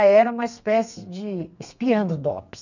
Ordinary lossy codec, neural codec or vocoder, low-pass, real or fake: none; codec, 32 kHz, 1.9 kbps, SNAC; 7.2 kHz; fake